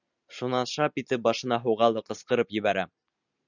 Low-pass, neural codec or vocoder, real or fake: 7.2 kHz; none; real